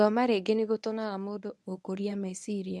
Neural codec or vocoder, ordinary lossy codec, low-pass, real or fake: codec, 24 kHz, 0.9 kbps, WavTokenizer, medium speech release version 1; none; none; fake